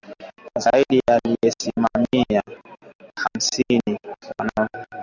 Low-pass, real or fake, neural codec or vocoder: 7.2 kHz; real; none